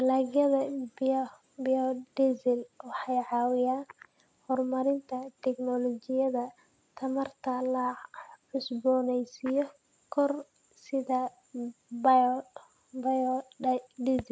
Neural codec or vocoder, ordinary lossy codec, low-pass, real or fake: none; none; none; real